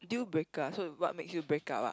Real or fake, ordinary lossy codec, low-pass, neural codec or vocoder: real; none; none; none